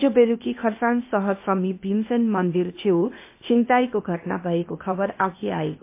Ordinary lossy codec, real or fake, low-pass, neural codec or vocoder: MP3, 24 kbps; fake; 3.6 kHz; codec, 16 kHz, 0.8 kbps, ZipCodec